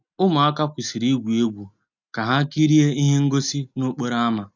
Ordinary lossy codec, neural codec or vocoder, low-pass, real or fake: none; none; 7.2 kHz; real